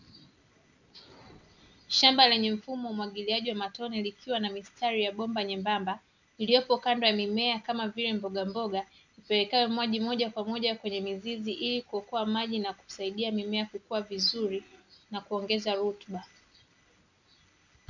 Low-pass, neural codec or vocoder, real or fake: 7.2 kHz; none; real